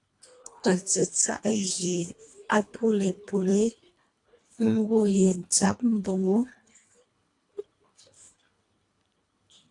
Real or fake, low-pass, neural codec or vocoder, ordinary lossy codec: fake; 10.8 kHz; codec, 24 kHz, 1.5 kbps, HILCodec; AAC, 48 kbps